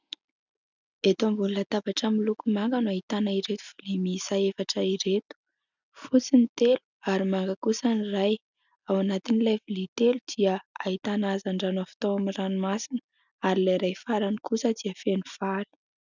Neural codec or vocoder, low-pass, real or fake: none; 7.2 kHz; real